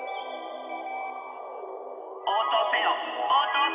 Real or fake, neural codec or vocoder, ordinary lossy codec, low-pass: real; none; none; 3.6 kHz